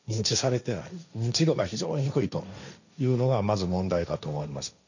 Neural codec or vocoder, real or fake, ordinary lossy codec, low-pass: codec, 16 kHz, 1.1 kbps, Voila-Tokenizer; fake; none; 7.2 kHz